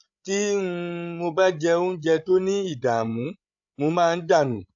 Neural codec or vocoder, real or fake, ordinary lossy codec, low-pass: codec, 16 kHz, 16 kbps, FreqCodec, larger model; fake; AAC, 64 kbps; 7.2 kHz